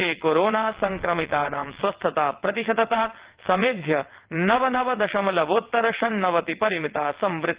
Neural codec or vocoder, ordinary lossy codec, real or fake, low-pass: vocoder, 22.05 kHz, 80 mel bands, WaveNeXt; Opus, 16 kbps; fake; 3.6 kHz